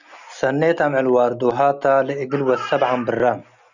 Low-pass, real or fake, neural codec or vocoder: 7.2 kHz; real; none